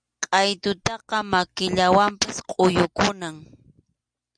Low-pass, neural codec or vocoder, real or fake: 9.9 kHz; none; real